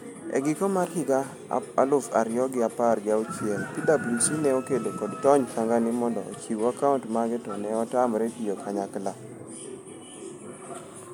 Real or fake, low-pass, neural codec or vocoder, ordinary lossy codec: real; 19.8 kHz; none; MP3, 96 kbps